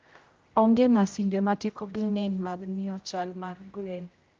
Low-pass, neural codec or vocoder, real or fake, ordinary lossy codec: 7.2 kHz; codec, 16 kHz, 0.5 kbps, X-Codec, HuBERT features, trained on general audio; fake; Opus, 24 kbps